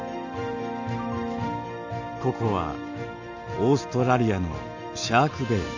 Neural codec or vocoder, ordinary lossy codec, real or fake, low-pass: none; none; real; 7.2 kHz